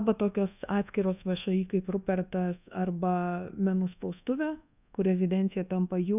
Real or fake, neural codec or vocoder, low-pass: fake; codec, 24 kHz, 1.2 kbps, DualCodec; 3.6 kHz